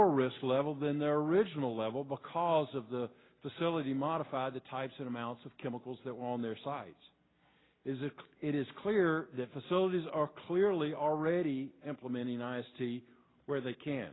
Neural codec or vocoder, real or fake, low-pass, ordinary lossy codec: none; real; 7.2 kHz; AAC, 16 kbps